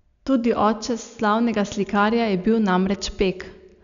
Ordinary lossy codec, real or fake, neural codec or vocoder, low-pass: none; real; none; 7.2 kHz